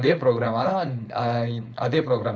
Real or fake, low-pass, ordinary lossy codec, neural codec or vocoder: fake; none; none; codec, 16 kHz, 4.8 kbps, FACodec